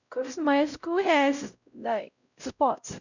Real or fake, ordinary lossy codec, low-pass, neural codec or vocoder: fake; none; 7.2 kHz; codec, 16 kHz, 0.5 kbps, X-Codec, WavLM features, trained on Multilingual LibriSpeech